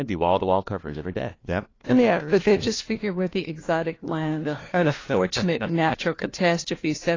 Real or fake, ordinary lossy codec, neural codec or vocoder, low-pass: fake; AAC, 32 kbps; codec, 16 kHz, 1 kbps, FunCodec, trained on LibriTTS, 50 frames a second; 7.2 kHz